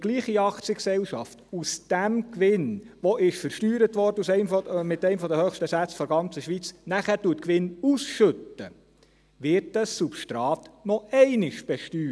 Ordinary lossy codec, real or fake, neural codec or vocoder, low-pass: none; real; none; none